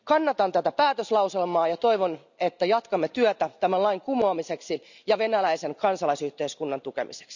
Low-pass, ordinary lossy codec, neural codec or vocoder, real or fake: 7.2 kHz; none; none; real